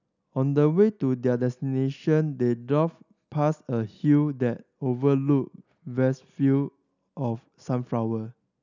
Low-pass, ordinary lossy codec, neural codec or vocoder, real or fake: 7.2 kHz; none; none; real